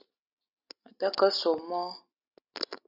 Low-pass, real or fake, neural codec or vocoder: 5.4 kHz; real; none